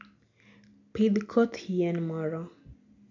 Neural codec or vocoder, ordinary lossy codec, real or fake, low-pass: none; MP3, 64 kbps; real; 7.2 kHz